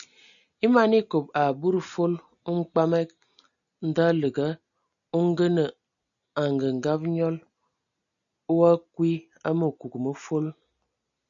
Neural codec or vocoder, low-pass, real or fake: none; 7.2 kHz; real